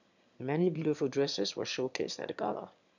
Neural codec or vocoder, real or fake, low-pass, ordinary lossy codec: autoencoder, 22.05 kHz, a latent of 192 numbers a frame, VITS, trained on one speaker; fake; 7.2 kHz; none